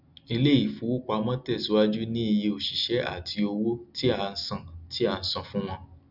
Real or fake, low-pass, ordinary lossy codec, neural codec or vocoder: real; 5.4 kHz; none; none